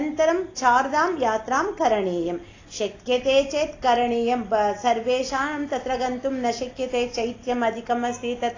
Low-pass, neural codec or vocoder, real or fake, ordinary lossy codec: 7.2 kHz; none; real; AAC, 32 kbps